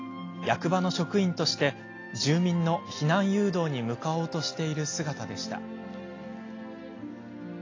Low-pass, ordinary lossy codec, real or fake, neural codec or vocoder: 7.2 kHz; AAC, 32 kbps; real; none